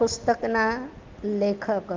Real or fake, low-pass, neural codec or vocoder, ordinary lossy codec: real; 7.2 kHz; none; Opus, 32 kbps